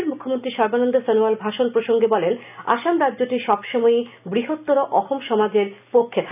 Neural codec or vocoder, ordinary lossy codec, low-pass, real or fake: none; none; 3.6 kHz; real